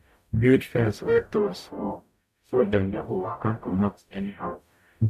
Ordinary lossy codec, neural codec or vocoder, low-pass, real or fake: none; codec, 44.1 kHz, 0.9 kbps, DAC; 14.4 kHz; fake